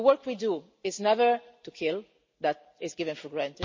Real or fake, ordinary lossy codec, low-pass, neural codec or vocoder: real; MP3, 32 kbps; 7.2 kHz; none